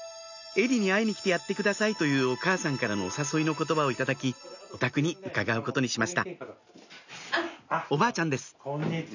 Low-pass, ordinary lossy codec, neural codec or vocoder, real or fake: 7.2 kHz; none; none; real